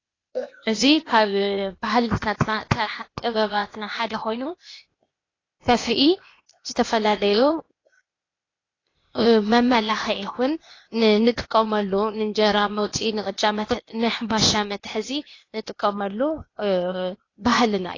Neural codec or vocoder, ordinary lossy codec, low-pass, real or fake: codec, 16 kHz, 0.8 kbps, ZipCodec; AAC, 32 kbps; 7.2 kHz; fake